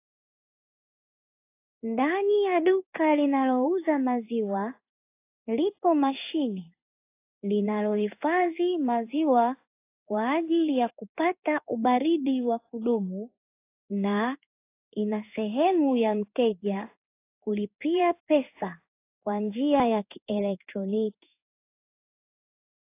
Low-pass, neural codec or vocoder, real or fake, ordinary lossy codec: 3.6 kHz; codec, 16 kHz in and 24 kHz out, 1 kbps, XY-Tokenizer; fake; AAC, 24 kbps